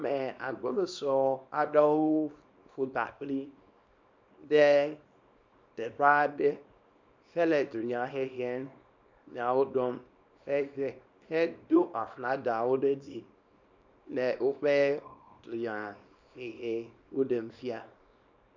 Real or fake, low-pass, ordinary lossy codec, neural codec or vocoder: fake; 7.2 kHz; MP3, 64 kbps; codec, 24 kHz, 0.9 kbps, WavTokenizer, small release